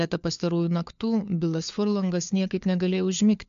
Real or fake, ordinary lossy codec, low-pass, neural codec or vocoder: fake; MP3, 64 kbps; 7.2 kHz; codec, 16 kHz, 4 kbps, FunCodec, trained on LibriTTS, 50 frames a second